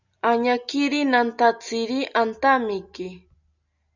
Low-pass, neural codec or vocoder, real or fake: 7.2 kHz; none; real